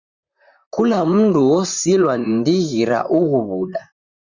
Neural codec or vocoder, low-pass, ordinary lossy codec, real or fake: vocoder, 44.1 kHz, 128 mel bands, Pupu-Vocoder; 7.2 kHz; Opus, 64 kbps; fake